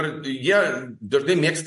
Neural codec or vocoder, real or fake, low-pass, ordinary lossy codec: none; real; 14.4 kHz; MP3, 48 kbps